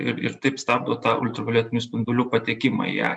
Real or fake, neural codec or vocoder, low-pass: real; none; 9.9 kHz